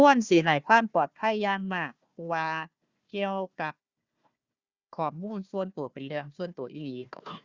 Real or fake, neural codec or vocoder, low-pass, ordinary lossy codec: fake; codec, 16 kHz, 1 kbps, FunCodec, trained on Chinese and English, 50 frames a second; 7.2 kHz; Opus, 64 kbps